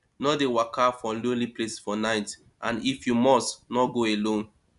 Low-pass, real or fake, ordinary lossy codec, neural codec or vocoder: 10.8 kHz; real; none; none